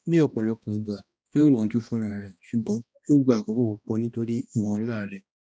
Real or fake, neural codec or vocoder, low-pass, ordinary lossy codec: fake; codec, 16 kHz, 1 kbps, X-Codec, HuBERT features, trained on balanced general audio; none; none